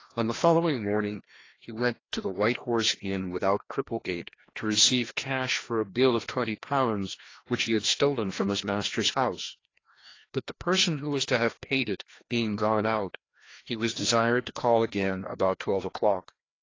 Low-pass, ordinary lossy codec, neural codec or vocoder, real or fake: 7.2 kHz; AAC, 32 kbps; codec, 16 kHz, 1 kbps, FreqCodec, larger model; fake